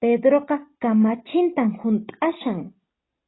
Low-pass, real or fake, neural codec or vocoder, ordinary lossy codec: 7.2 kHz; real; none; AAC, 16 kbps